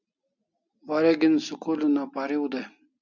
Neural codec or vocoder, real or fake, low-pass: none; real; 7.2 kHz